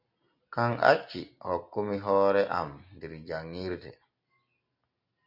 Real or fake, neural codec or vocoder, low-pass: real; none; 5.4 kHz